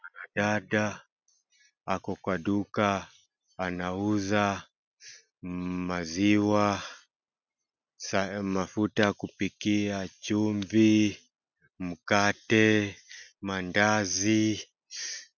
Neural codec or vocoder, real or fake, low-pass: none; real; 7.2 kHz